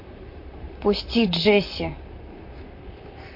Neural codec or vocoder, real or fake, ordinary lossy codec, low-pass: vocoder, 44.1 kHz, 128 mel bands, Pupu-Vocoder; fake; MP3, 32 kbps; 5.4 kHz